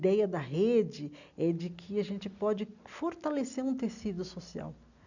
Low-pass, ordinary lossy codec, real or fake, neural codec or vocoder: 7.2 kHz; none; real; none